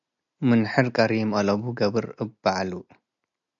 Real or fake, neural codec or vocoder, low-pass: real; none; 7.2 kHz